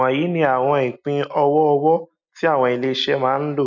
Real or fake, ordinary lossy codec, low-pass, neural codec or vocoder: real; none; 7.2 kHz; none